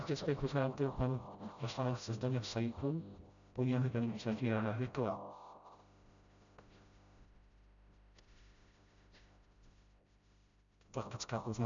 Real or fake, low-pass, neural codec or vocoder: fake; 7.2 kHz; codec, 16 kHz, 0.5 kbps, FreqCodec, smaller model